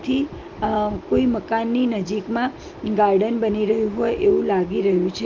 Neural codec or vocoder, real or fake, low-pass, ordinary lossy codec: none; real; 7.2 kHz; Opus, 32 kbps